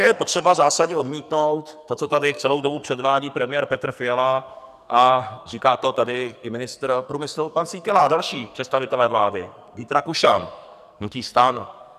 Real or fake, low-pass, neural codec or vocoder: fake; 14.4 kHz; codec, 44.1 kHz, 2.6 kbps, SNAC